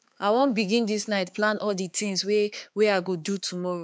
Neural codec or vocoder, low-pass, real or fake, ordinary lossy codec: codec, 16 kHz, 4 kbps, X-Codec, HuBERT features, trained on balanced general audio; none; fake; none